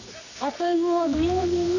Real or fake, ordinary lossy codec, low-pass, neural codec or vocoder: fake; none; 7.2 kHz; codec, 24 kHz, 0.9 kbps, WavTokenizer, medium music audio release